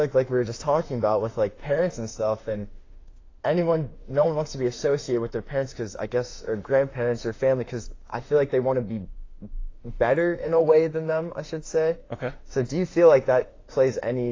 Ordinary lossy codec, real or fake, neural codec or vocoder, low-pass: AAC, 32 kbps; fake; autoencoder, 48 kHz, 32 numbers a frame, DAC-VAE, trained on Japanese speech; 7.2 kHz